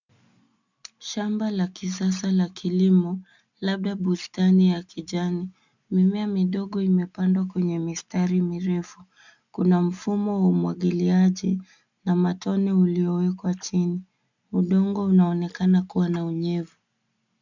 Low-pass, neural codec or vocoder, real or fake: 7.2 kHz; none; real